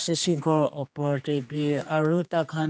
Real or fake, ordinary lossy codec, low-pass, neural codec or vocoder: fake; none; none; codec, 16 kHz, 2 kbps, X-Codec, HuBERT features, trained on general audio